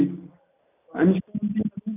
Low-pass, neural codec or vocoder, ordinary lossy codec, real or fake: 3.6 kHz; none; none; real